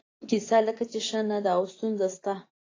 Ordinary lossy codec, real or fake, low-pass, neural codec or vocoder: AAC, 32 kbps; fake; 7.2 kHz; vocoder, 24 kHz, 100 mel bands, Vocos